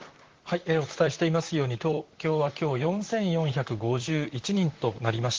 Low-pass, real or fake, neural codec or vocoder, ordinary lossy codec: 7.2 kHz; fake; vocoder, 44.1 kHz, 128 mel bands, Pupu-Vocoder; Opus, 16 kbps